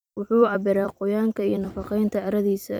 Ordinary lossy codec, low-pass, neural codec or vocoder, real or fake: none; none; vocoder, 44.1 kHz, 128 mel bands every 256 samples, BigVGAN v2; fake